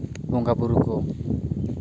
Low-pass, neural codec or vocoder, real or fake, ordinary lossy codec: none; none; real; none